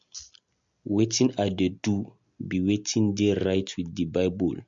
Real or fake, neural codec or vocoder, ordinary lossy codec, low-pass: real; none; MP3, 48 kbps; 7.2 kHz